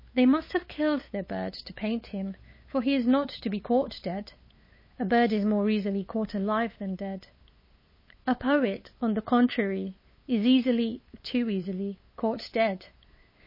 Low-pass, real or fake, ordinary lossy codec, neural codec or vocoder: 5.4 kHz; fake; MP3, 24 kbps; codec, 16 kHz, 8 kbps, FunCodec, trained on LibriTTS, 25 frames a second